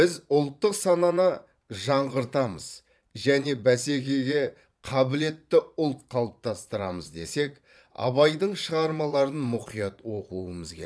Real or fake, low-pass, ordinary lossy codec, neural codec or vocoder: fake; none; none; vocoder, 22.05 kHz, 80 mel bands, Vocos